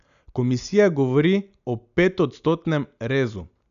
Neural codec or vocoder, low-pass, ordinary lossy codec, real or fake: none; 7.2 kHz; none; real